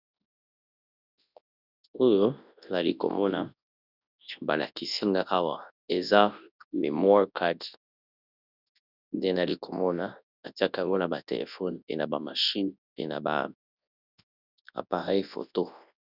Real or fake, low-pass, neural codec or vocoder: fake; 5.4 kHz; codec, 24 kHz, 0.9 kbps, WavTokenizer, large speech release